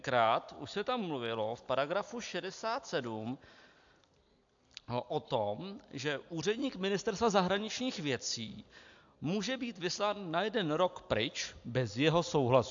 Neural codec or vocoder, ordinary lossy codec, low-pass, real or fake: none; AAC, 64 kbps; 7.2 kHz; real